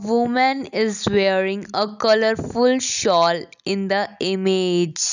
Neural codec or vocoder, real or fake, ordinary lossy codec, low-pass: none; real; none; 7.2 kHz